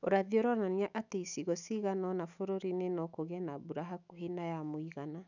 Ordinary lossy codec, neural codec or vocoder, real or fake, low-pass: none; autoencoder, 48 kHz, 128 numbers a frame, DAC-VAE, trained on Japanese speech; fake; 7.2 kHz